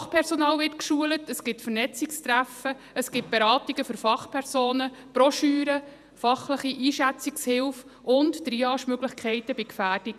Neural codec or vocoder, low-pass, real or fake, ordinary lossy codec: vocoder, 48 kHz, 128 mel bands, Vocos; 14.4 kHz; fake; none